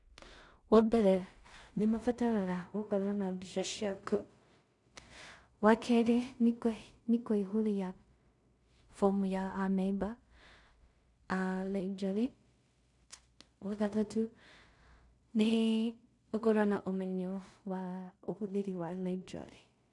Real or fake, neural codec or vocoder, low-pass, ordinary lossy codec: fake; codec, 16 kHz in and 24 kHz out, 0.4 kbps, LongCat-Audio-Codec, two codebook decoder; 10.8 kHz; none